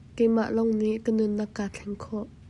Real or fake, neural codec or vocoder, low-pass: real; none; 10.8 kHz